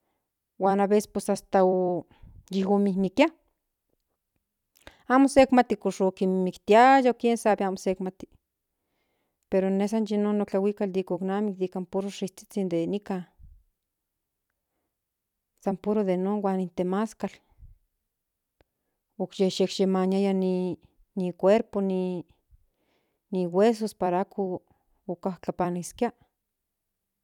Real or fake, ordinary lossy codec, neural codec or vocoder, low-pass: fake; none; vocoder, 44.1 kHz, 128 mel bands every 256 samples, BigVGAN v2; 19.8 kHz